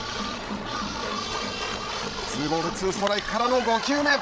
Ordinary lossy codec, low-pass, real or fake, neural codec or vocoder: none; none; fake; codec, 16 kHz, 16 kbps, FreqCodec, larger model